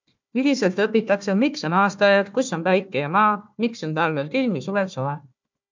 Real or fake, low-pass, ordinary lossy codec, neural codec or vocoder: fake; 7.2 kHz; MP3, 64 kbps; codec, 16 kHz, 1 kbps, FunCodec, trained on Chinese and English, 50 frames a second